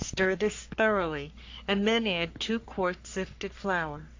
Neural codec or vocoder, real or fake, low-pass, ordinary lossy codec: codec, 44.1 kHz, 3.4 kbps, Pupu-Codec; fake; 7.2 kHz; AAC, 48 kbps